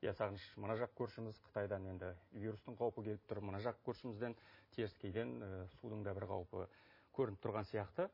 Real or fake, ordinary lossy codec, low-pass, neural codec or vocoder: real; MP3, 24 kbps; 5.4 kHz; none